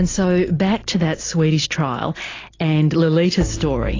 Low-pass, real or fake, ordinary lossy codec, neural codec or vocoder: 7.2 kHz; real; AAC, 32 kbps; none